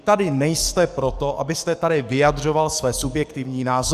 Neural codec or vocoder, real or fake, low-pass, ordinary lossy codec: codec, 44.1 kHz, 7.8 kbps, DAC; fake; 14.4 kHz; Opus, 64 kbps